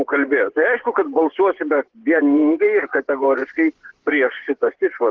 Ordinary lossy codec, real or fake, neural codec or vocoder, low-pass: Opus, 16 kbps; fake; vocoder, 24 kHz, 100 mel bands, Vocos; 7.2 kHz